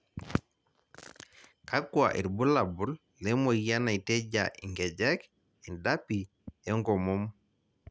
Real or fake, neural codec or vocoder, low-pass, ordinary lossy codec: real; none; none; none